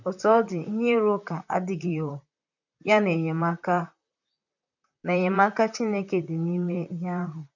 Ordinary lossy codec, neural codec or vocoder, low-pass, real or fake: none; vocoder, 44.1 kHz, 128 mel bands, Pupu-Vocoder; 7.2 kHz; fake